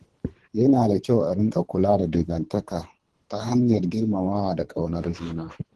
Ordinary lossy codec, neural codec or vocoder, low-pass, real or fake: Opus, 16 kbps; codec, 24 kHz, 3 kbps, HILCodec; 10.8 kHz; fake